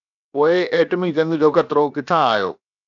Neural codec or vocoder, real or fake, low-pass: codec, 16 kHz, 0.7 kbps, FocalCodec; fake; 7.2 kHz